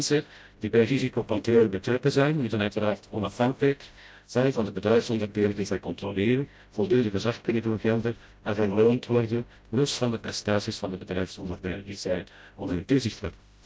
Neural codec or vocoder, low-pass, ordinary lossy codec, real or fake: codec, 16 kHz, 0.5 kbps, FreqCodec, smaller model; none; none; fake